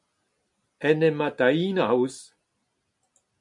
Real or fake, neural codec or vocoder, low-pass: real; none; 10.8 kHz